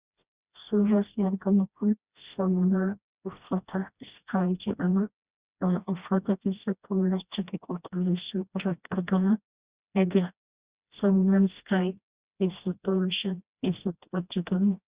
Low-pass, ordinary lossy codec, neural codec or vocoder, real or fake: 3.6 kHz; Opus, 64 kbps; codec, 16 kHz, 1 kbps, FreqCodec, smaller model; fake